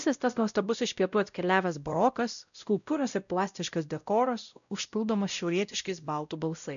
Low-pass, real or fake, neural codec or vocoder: 7.2 kHz; fake; codec, 16 kHz, 0.5 kbps, X-Codec, WavLM features, trained on Multilingual LibriSpeech